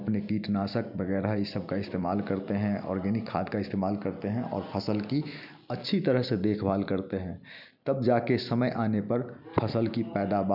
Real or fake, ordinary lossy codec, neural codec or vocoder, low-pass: real; none; none; 5.4 kHz